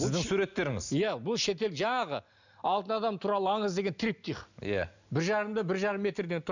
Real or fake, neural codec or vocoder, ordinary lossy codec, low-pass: real; none; none; 7.2 kHz